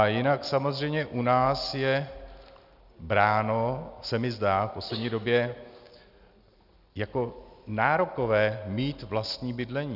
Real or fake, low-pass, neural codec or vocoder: real; 5.4 kHz; none